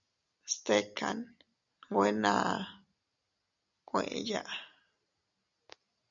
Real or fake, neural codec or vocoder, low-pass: real; none; 7.2 kHz